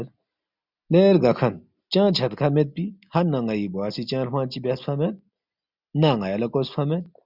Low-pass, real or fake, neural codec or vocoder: 5.4 kHz; real; none